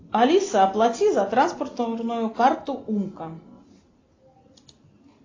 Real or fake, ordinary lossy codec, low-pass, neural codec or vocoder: real; AAC, 32 kbps; 7.2 kHz; none